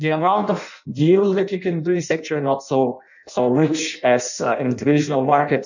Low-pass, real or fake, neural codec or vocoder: 7.2 kHz; fake; codec, 16 kHz in and 24 kHz out, 0.6 kbps, FireRedTTS-2 codec